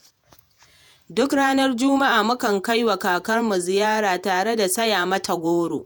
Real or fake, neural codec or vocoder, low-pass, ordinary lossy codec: fake; vocoder, 48 kHz, 128 mel bands, Vocos; none; none